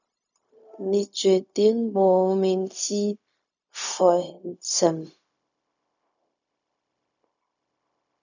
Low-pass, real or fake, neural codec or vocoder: 7.2 kHz; fake; codec, 16 kHz, 0.4 kbps, LongCat-Audio-Codec